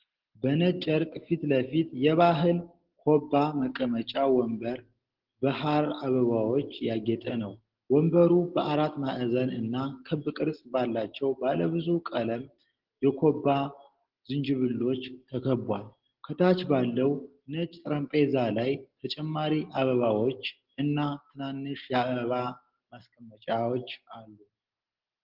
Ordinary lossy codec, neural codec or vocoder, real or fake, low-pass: Opus, 16 kbps; none; real; 5.4 kHz